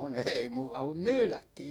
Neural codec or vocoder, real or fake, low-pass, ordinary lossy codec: codec, 44.1 kHz, 2.6 kbps, DAC; fake; 19.8 kHz; none